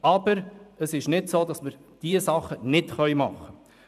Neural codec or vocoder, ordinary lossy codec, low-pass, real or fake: vocoder, 44.1 kHz, 128 mel bands every 512 samples, BigVGAN v2; none; 14.4 kHz; fake